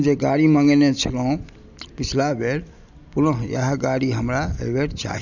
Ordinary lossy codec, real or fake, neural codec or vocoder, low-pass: none; real; none; 7.2 kHz